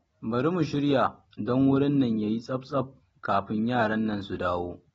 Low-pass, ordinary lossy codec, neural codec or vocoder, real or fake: 19.8 kHz; AAC, 24 kbps; none; real